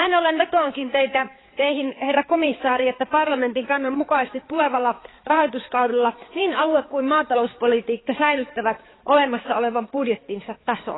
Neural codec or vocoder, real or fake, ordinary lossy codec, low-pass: codec, 16 kHz, 4 kbps, X-Codec, HuBERT features, trained on balanced general audio; fake; AAC, 16 kbps; 7.2 kHz